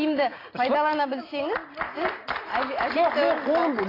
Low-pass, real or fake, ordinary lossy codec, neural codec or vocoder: 5.4 kHz; real; AAC, 24 kbps; none